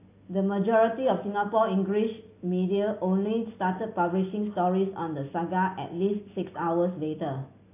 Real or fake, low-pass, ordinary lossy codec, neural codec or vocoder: real; 3.6 kHz; AAC, 24 kbps; none